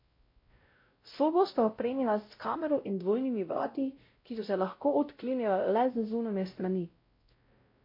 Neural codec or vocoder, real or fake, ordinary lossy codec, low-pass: codec, 16 kHz, 0.5 kbps, X-Codec, WavLM features, trained on Multilingual LibriSpeech; fake; MP3, 32 kbps; 5.4 kHz